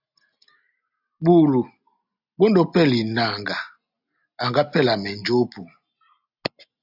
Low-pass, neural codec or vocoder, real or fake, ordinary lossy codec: 5.4 kHz; none; real; AAC, 48 kbps